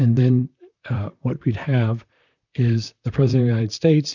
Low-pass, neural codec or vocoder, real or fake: 7.2 kHz; vocoder, 44.1 kHz, 128 mel bands, Pupu-Vocoder; fake